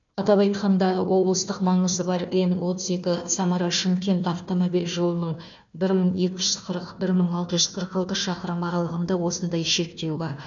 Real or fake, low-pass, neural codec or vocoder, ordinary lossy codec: fake; 7.2 kHz; codec, 16 kHz, 1 kbps, FunCodec, trained on Chinese and English, 50 frames a second; none